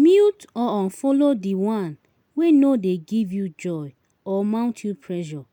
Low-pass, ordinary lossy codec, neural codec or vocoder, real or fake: 19.8 kHz; none; none; real